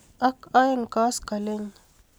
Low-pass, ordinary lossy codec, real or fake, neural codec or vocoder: none; none; real; none